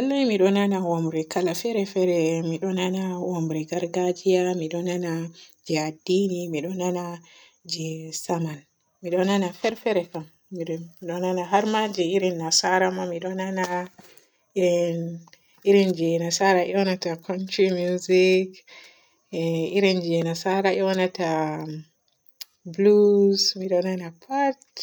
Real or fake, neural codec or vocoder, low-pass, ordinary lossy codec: real; none; none; none